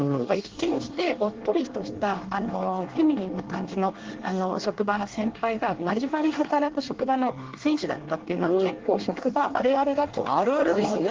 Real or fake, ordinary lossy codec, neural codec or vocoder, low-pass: fake; Opus, 16 kbps; codec, 24 kHz, 1 kbps, SNAC; 7.2 kHz